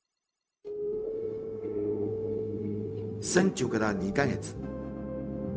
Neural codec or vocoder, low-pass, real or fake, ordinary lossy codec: codec, 16 kHz, 0.4 kbps, LongCat-Audio-Codec; none; fake; none